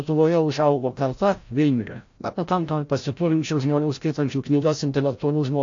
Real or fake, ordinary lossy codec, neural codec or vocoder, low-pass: fake; AAC, 48 kbps; codec, 16 kHz, 0.5 kbps, FreqCodec, larger model; 7.2 kHz